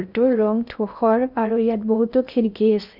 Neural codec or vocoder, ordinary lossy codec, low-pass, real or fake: codec, 16 kHz in and 24 kHz out, 0.6 kbps, FocalCodec, streaming, 2048 codes; none; 5.4 kHz; fake